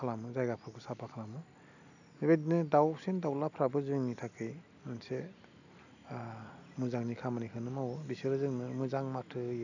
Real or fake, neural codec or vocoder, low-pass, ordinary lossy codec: real; none; 7.2 kHz; none